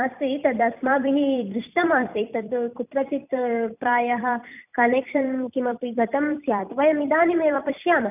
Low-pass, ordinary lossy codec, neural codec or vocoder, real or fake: 3.6 kHz; none; vocoder, 44.1 kHz, 128 mel bands every 256 samples, BigVGAN v2; fake